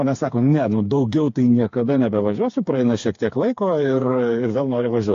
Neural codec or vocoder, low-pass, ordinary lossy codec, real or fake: codec, 16 kHz, 4 kbps, FreqCodec, smaller model; 7.2 kHz; AAC, 48 kbps; fake